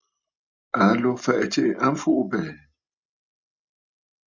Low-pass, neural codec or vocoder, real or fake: 7.2 kHz; none; real